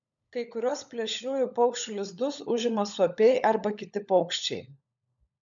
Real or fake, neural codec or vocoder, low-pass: fake; codec, 16 kHz, 16 kbps, FunCodec, trained on LibriTTS, 50 frames a second; 7.2 kHz